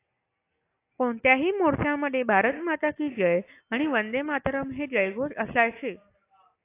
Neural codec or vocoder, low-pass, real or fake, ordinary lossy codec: none; 3.6 kHz; real; AAC, 24 kbps